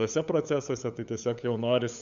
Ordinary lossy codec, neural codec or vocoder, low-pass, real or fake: MP3, 96 kbps; codec, 16 kHz, 8 kbps, FunCodec, trained on LibriTTS, 25 frames a second; 7.2 kHz; fake